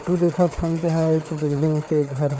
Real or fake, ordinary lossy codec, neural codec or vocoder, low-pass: fake; none; codec, 16 kHz, 4 kbps, FreqCodec, larger model; none